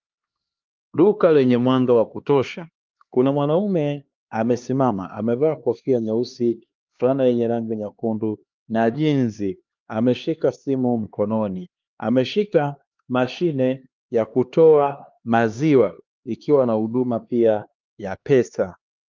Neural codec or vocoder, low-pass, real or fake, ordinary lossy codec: codec, 16 kHz, 2 kbps, X-Codec, HuBERT features, trained on LibriSpeech; 7.2 kHz; fake; Opus, 32 kbps